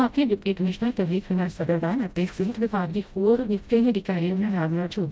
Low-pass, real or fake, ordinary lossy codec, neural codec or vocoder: none; fake; none; codec, 16 kHz, 0.5 kbps, FreqCodec, smaller model